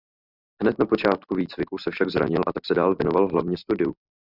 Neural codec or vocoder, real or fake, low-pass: none; real; 5.4 kHz